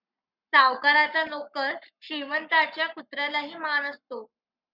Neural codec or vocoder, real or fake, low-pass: codec, 44.1 kHz, 7.8 kbps, Pupu-Codec; fake; 5.4 kHz